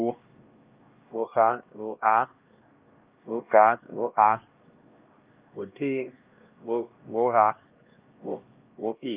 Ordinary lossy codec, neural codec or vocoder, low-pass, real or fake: Opus, 32 kbps; codec, 16 kHz, 1 kbps, X-Codec, HuBERT features, trained on LibriSpeech; 3.6 kHz; fake